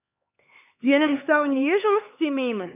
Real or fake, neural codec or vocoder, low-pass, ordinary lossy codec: fake; codec, 16 kHz, 4 kbps, X-Codec, HuBERT features, trained on LibriSpeech; 3.6 kHz; none